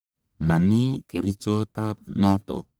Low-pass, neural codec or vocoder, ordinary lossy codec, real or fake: none; codec, 44.1 kHz, 1.7 kbps, Pupu-Codec; none; fake